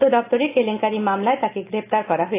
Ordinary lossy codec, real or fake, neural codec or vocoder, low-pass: AAC, 24 kbps; real; none; 3.6 kHz